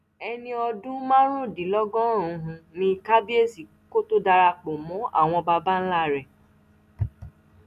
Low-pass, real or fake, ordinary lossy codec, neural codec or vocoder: 14.4 kHz; real; none; none